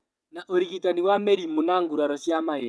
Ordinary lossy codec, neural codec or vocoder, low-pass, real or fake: none; vocoder, 22.05 kHz, 80 mel bands, WaveNeXt; none; fake